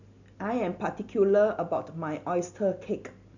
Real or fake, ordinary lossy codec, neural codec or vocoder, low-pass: real; none; none; 7.2 kHz